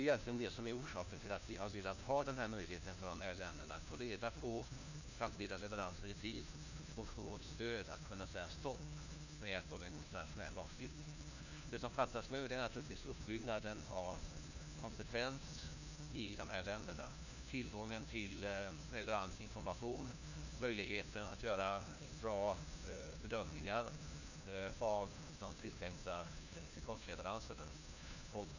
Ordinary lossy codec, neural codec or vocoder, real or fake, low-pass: none; codec, 16 kHz, 1 kbps, FunCodec, trained on LibriTTS, 50 frames a second; fake; 7.2 kHz